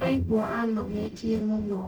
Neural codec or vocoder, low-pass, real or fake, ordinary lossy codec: codec, 44.1 kHz, 0.9 kbps, DAC; 19.8 kHz; fake; none